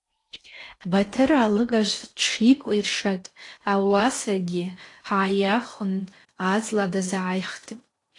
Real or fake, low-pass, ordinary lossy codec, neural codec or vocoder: fake; 10.8 kHz; AAC, 48 kbps; codec, 16 kHz in and 24 kHz out, 0.6 kbps, FocalCodec, streaming, 4096 codes